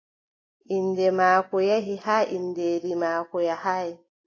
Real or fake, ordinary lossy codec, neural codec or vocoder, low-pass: real; AAC, 32 kbps; none; 7.2 kHz